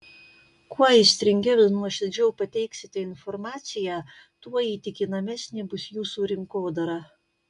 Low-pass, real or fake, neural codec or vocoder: 10.8 kHz; real; none